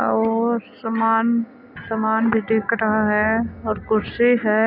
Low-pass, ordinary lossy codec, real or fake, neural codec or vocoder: 5.4 kHz; none; real; none